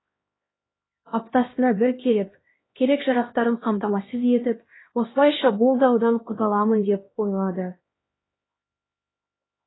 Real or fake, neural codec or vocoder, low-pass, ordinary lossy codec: fake; codec, 16 kHz, 1 kbps, X-Codec, HuBERT features, trained on LibriSpeech; 7.2 kHz; AAC, 16 kbps